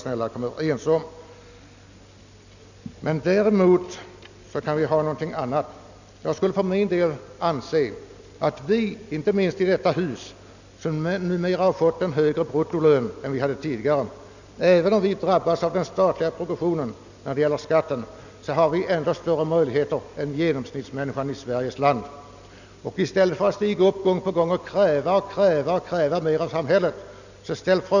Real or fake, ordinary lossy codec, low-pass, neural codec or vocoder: real; none; 7.2 kHz; none